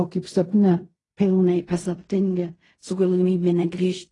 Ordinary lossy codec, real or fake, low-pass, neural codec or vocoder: AAC, 32 kbps; fake; 10.8 kHz; codec, 16 kHz in and 24 kHz out, 0.4 kbps, LongCat-Audio-Codec, fine tuned four codebook decoder